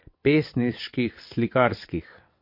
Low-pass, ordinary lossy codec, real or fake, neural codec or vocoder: 5.4 kHz; MP3, 32 kbps; fake; vocoder, 22.05 kHz, 80 mel bands, WaveNeXt